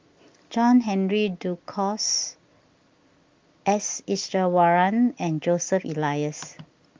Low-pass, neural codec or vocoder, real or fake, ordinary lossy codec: 7.2 kHz; none; real; Opus, 32 kbps